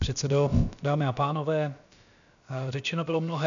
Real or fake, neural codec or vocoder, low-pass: fake; codec, 16 kHz, about 1 kbps, DyCAST, with the encoder's durations; 7.2 kHz